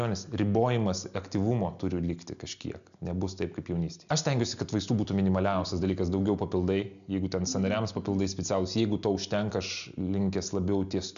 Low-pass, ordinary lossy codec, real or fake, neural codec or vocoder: 7.2 kHz; MP3, 96 kbps; real; none